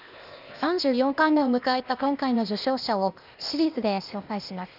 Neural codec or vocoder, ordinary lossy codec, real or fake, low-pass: codec, 16 kHz, 0.8 kbps, ZipCodec; none; fake; 5.4 kHz